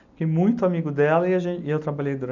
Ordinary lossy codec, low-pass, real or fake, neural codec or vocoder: none; 7.2 kHz; real; none